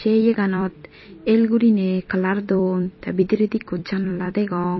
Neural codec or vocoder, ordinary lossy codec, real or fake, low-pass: vocoder, 44.1 kHz, 128 mel bands every 256 samples, BigVGAN v2; MP3, 24 kbps; fake; 7.2 kHz